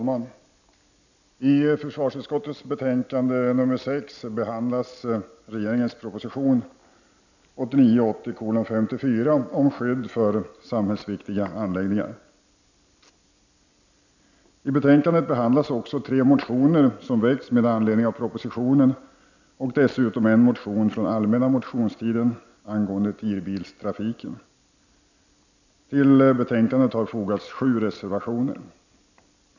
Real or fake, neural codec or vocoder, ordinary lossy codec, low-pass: real; none; none; 7.2 kHz